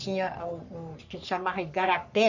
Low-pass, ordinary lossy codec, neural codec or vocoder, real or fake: 7.2 kHz; none; codec, 44.1 kHz, 3.4 kbps, Pupu-Codec; fake